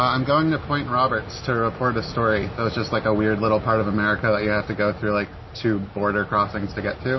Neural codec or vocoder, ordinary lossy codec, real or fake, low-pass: none; MP3, 24 kbps; real; 7.2 kHz